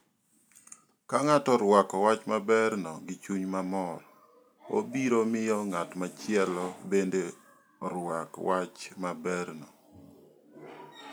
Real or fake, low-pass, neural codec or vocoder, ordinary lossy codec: real; none; none; none